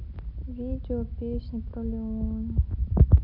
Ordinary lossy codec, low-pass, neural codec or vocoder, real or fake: none; 5.4 kHz; none; real